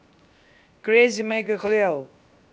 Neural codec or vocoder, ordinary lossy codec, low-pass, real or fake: codec, 16 kHz, 0.7 kbps, FocalCodec; none; none; fake